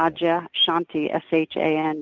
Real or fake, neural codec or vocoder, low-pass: real; none; 7.2 kHz